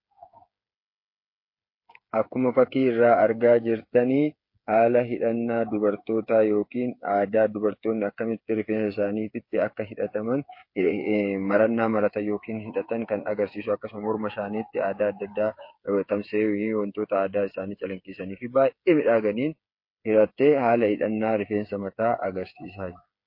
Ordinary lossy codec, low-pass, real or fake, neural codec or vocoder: MP3, 32 kbps; 5.4 kHz; fake; codec, 16 kHz, 8 kbps, FreqCodec, smaller model